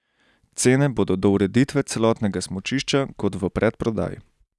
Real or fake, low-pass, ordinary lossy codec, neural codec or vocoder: real; none; none; none